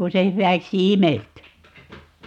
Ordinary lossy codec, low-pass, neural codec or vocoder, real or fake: none; 19.8 kHz; none; real